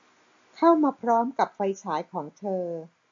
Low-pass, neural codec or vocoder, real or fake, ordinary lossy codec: 7.2 kHz; none; real; AAC, 48 kbps